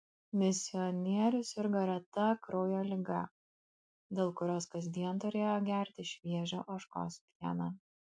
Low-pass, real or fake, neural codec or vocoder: 9.9 kHz; real; none